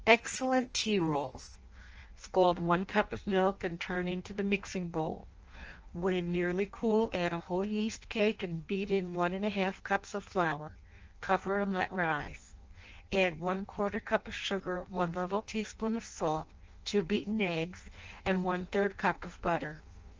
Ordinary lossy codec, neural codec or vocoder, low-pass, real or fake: Opus, 24 kbps; codec, 16 kHz in and 24 kHz out, 0.6 kbps, FireRedTTS-2 codec; 7.2 kHz; fake